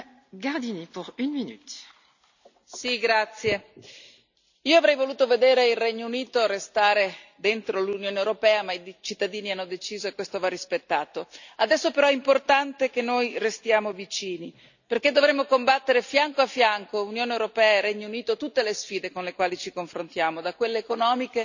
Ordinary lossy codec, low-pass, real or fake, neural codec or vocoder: none; 7.2 kHz; real; none